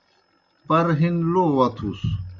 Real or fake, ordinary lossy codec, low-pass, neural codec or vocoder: real; Opus, 64 kbps; 7.2 kHz; none